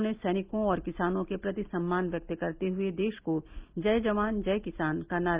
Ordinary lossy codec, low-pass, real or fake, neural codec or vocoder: Opus, 32 kbps; 3.6 kHz; real; none